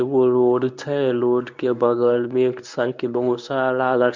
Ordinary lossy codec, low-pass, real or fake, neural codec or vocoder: MP3, 64 kbps; 7.2 kHz; fake; codec, 24 kHz, 0.9 kbps, WavTokenizer, medium speech release version 1